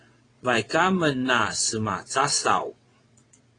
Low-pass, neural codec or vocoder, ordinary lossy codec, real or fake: 9.9 kHz; vocoder, 22.05 kHz, 80 mel bands, WaveNeXt; AAC, 32 kbps; fake